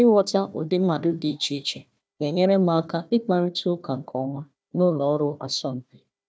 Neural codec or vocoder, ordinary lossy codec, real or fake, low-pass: codec, 16 kHz, 1 kbps, FunCodec, trained on Chinese and English, 50 frames a second; none; fake; none